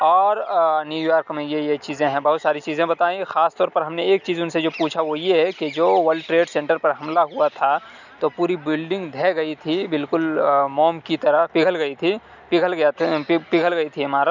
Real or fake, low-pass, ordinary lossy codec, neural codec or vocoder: real; 7.2 kHz; none; none